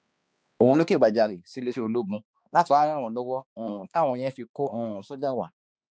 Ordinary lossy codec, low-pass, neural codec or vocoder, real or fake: none; none; codec, 16 kHz, 2 kbps, X-Codec, HuBERT features, trained on balanced general audio; fake